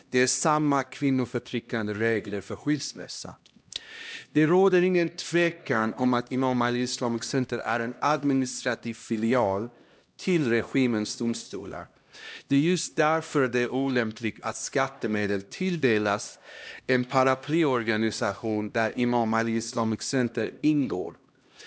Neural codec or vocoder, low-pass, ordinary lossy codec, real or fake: codec, 16 kHz, 1 kbps, X-Codec, HuBERT features, trained on LibriSpeech; none; none; fake